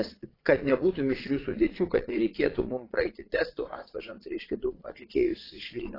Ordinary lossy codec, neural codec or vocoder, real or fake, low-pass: AAC, 24 kbps; vocoder, 22.05 kHz, 80 mel bands, Vocos; fake; 5.4 kHz